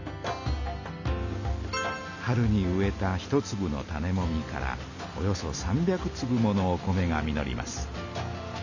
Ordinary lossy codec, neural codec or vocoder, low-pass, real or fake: none; none; 7.2 kHz; real